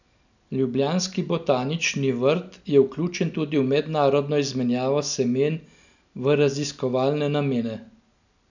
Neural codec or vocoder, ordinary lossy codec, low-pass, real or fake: none; none; 7.2 kHz; real